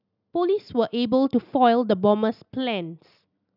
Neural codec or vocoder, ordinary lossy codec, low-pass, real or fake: none; none; 5.4 kHz; real